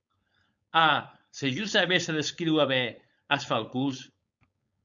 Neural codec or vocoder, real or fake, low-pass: codec, 16 kHz, 4.8 kbps, FACodec; fake; 7.2 kHz